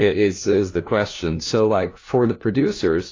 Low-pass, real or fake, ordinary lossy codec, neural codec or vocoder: 7.2 kHz; fake; AAC, 32 kbps; codec, 16 kHz, 1 kbps, FunCodec, trained on LibriTTS, 50 frames a second